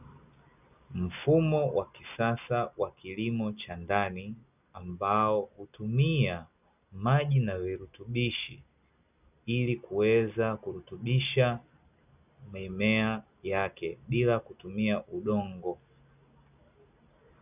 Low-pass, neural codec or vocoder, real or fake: 3.6 kHz; none; real